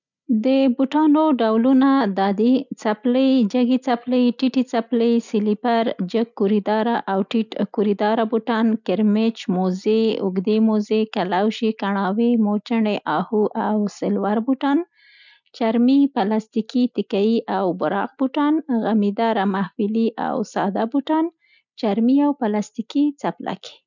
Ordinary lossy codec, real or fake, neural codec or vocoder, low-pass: none; real; none; 7.2 kHz